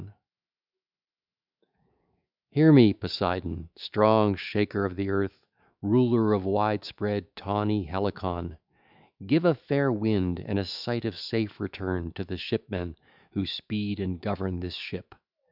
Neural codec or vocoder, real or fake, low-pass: autoencoder, 48 kHz, 128 numbers a frame, DAC-VAE, trained on Japanese speech; fake; 5.4 kHz